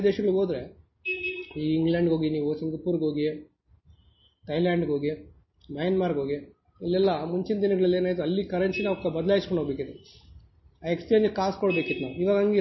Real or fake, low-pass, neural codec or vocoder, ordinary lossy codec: real; 7.2 kHz; none; MP3, 24 kbps